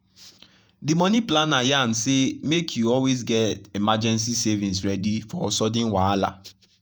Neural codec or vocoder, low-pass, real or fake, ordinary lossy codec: vocoder, 48 kHz, 128 mel bands, Vocos; none; fake; none